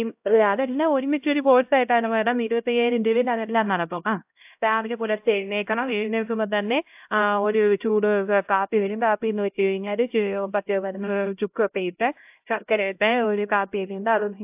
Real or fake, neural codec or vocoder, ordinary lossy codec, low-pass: fake; codec, 16 kHz, 0.5 kbps, X-Codec, HuBERT features, trained on LibriSpeech; AAC, 32 kbps; 3.6 kHz